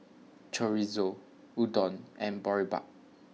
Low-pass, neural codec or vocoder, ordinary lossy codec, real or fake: none; none; none; real